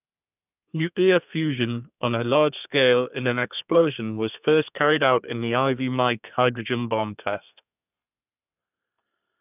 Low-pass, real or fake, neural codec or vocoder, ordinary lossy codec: 3.6 kHz; fake; codec, 32 kHz, 1.9 kbps, SNAC; none